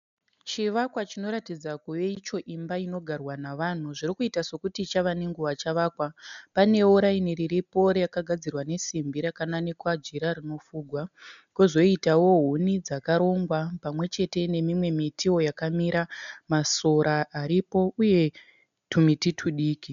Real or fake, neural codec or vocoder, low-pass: real; none; 7.2 kHz